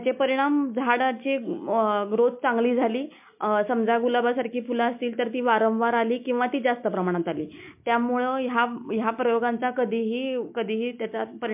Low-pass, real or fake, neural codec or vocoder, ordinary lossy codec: 3.6 kHz; real; none; MP3, 32 kbps